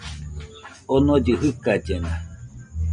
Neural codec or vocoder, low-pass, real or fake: none; 9.9 kHz; real